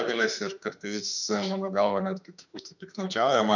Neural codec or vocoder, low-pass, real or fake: autoencoder, 48 kHz, 32 numbers a frame, DAC-VAE, trained on Japanese speech; 7.2 kHz; fake